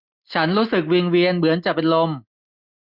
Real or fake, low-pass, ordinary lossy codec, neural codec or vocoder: real; 5.4 kHz; none; none